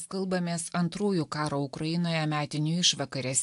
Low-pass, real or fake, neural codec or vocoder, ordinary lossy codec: 10.8 kHz; real; none; AAC, 64 kbps